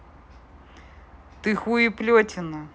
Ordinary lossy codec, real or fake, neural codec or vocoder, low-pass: none; real; none; none